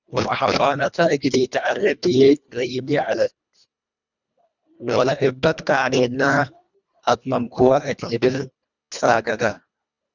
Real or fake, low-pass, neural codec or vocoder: fake; 7.2 kHz; codec, 24 kHz, 1.5 kbps, HILCodec